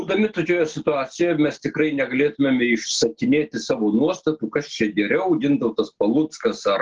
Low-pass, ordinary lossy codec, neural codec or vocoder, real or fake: 7.2 kHz; Opus, 16 kbps; none; real